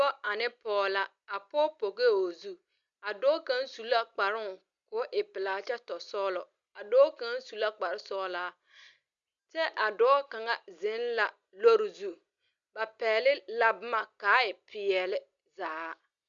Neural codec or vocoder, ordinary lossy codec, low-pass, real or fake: none; Opus, 64 kbps; 7.2 kHz; real